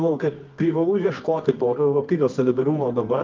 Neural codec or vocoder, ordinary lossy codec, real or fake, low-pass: codec, 24 kHz, 0.9 kbps, WavTokenizer, medium music audio release; Opus, 32 kbps; fake; 7.2 kHz